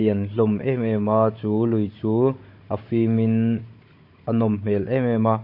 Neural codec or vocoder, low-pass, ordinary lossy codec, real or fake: codec, 16 kHz, 16 kbps, FunCodec, trained on Chinese and English, 50 frames a second; 5.4 kHz; MP3, 32 kbps; fake